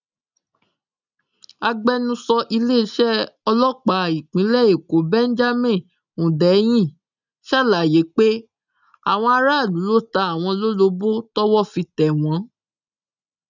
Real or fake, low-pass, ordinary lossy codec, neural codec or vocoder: real; 7.2 kHz; none; none